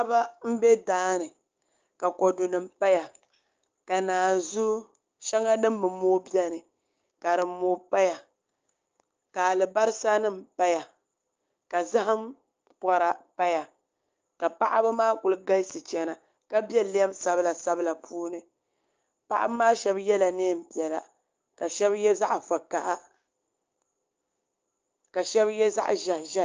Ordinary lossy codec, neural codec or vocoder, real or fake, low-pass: Opus, 24 kbps; codec, 16 kHz, 6 kbps, DAC; fake; 7.2 kHz